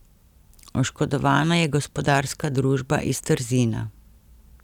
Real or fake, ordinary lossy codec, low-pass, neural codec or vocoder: real; none; 19.8 kHz; none